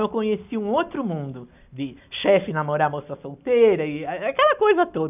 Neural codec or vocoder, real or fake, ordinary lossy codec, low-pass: none; real; none; 3.6 kHz